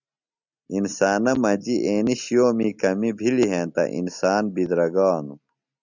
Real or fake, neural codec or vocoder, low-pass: real; none; 7.2 kHz